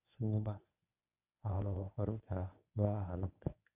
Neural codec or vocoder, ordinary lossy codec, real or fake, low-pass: codec, 24 kHz, 0.9 kbps, WavTokenizer, medium speech release version 1; none; fake; 3.6 kHz